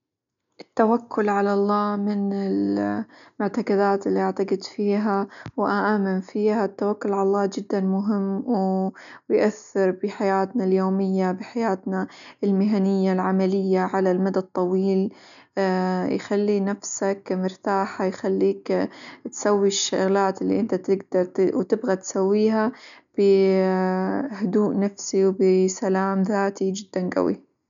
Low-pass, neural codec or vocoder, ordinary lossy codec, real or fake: 7.2 kHz; none; none; real